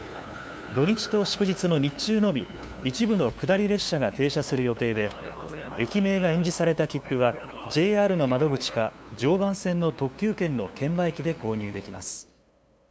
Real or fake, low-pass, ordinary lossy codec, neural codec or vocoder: fake; none; none; codec, 16 kHz, 2 kbps, FunCodec, trained on LibriTTS, 25 frames a second